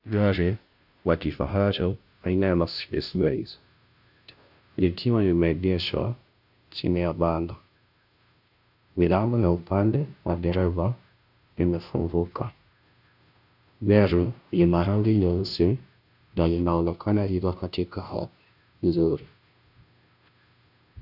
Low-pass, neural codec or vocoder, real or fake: 5.4 kHz; codec, 16 kHz, 0.5 kbps, FunCodec, trained on Chinese and English, 25 frames a second; fake